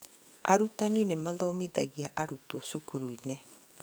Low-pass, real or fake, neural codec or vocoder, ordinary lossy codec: none; fake; codec, 44.1 kHz, 2.6 kbps, SNAC; none